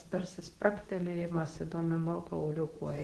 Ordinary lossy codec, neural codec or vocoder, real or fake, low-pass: Opus, 16 kbps; codec, 24 kHz, 0.9 kbps, WavTokenizer, medium speech release version 2; fake; 10.8 kHz